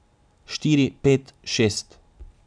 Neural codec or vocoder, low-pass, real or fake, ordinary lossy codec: none; 9.9 kHz; real; MP3, 96 kbps